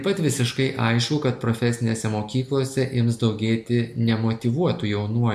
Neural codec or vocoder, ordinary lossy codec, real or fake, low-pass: none; AAC, 96 kbps; real; 14.4 kHz